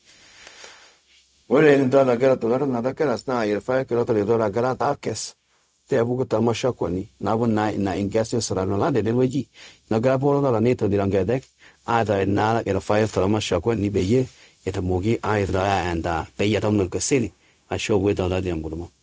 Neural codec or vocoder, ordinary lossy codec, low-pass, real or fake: codec, 16 kHz, 0.4 kbps, LongCat-Audio-Codec; none; none; fake